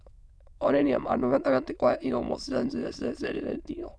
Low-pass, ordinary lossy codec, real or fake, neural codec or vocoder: none; none; fake; autoencoder, 22.05 kHz, a latent of 192 numbers a frame, VITS, trained on many speakers